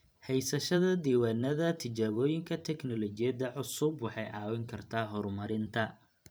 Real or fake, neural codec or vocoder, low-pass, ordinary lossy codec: fake; vocoder, 44.1 kHz, 128 mel bands every 512 samples, BigVGAN v2; none; none